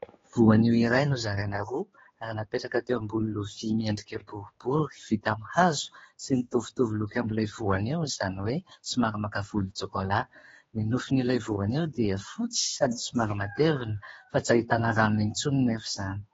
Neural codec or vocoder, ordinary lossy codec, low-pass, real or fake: codec, 16 kHz, 4 kbps, X-Codec, HuBERT features, trained on general audio; AAC, 24 kbps; 7.2 kHz; fake